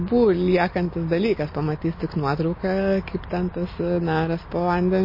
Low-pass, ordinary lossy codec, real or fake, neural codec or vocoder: 5.4 kHz; MP3, 24 kbps; real; none